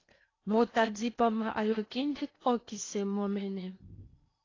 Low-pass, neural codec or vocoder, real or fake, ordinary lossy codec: 7.2 kHz; codec, 16 kHz in and 24 kHz out, 0.8 kbps, FocalCodec, streaming, 65536 codes; fake; AAC, 32 kbps